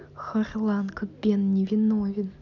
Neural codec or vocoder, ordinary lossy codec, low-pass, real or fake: none; none; 7.2 kHz; real